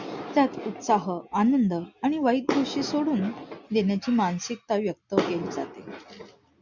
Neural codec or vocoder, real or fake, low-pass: none; real; 7.2 kHz